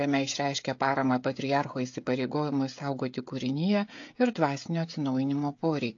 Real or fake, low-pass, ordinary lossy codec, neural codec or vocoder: fake; 7.2 kHz; AAC, 48 kbps; codec, 16 kHz, 16 kbps, FreqCodec, smaller model